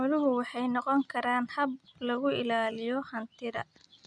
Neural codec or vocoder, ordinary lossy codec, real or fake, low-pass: none; none; real; 9.9 kHz